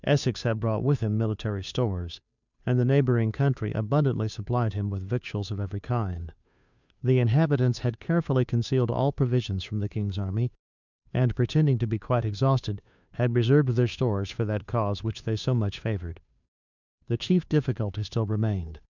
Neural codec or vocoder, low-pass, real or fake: codec, 16 kHz, 2 kbps, FunCodec, trained on Chinese and English, 25 frames a second; 7.2 kHz; fake